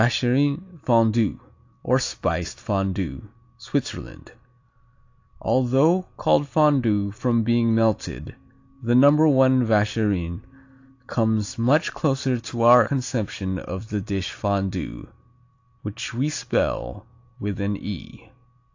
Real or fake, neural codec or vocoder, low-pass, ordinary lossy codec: real; none; 7.2 kHz; AAC, 48 kbps